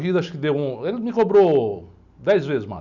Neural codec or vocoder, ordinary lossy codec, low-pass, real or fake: none; none; 7.2 kHz; real